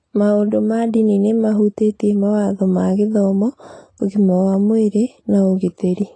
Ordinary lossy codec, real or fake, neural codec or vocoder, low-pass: AAC, 32 kbps; real; none; 9.9 kHz